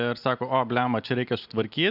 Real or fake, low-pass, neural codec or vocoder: real; 5.4 kHz; none